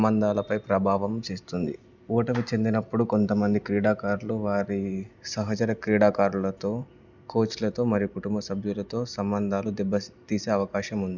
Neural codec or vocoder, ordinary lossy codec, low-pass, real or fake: none; none; 7.2 kHz; real